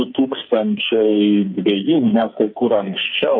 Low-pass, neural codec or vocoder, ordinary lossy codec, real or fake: 7.2 kHz; codec, 44.1 kHz, 2.6 kbps, SNAC; MP3, 32 kbps; fake